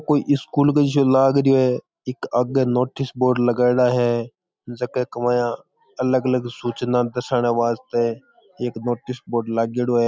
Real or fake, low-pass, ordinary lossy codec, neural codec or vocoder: real; none; none; none